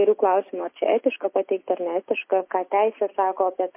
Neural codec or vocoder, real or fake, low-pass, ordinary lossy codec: none; real; 3.6 kHz; MP3, 24 kbps